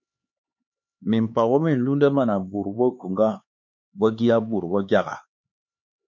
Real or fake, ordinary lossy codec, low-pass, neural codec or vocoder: fake; MP3, 48 kbps; 7.2 kHz; codec, 16 kHz, 4 kbps, X-Codec, HuBERT features, trained on LibriSpeech